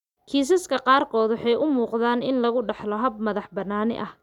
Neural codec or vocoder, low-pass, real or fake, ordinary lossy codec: none; 19.8 kHz; real; none